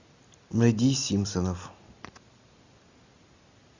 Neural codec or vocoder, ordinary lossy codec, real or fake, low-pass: none; Opus, 64 kbps; real; 7.2 kHz